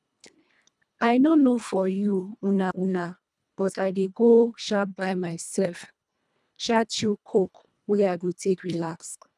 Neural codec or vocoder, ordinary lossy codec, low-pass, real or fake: codec, 24 kHz, 1.5 kbps, HILCodec; none; none; fake